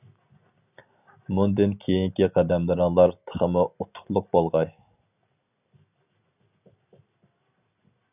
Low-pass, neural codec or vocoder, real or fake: 3.6 kHz; none; real